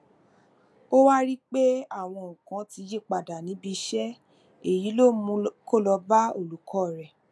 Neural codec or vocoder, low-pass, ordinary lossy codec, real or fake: none; none; none; real